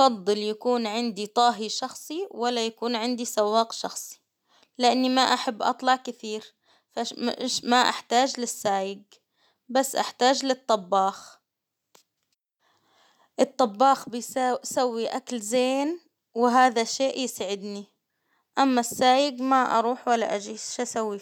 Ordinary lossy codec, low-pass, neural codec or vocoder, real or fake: none; 19.8 kHz; none; real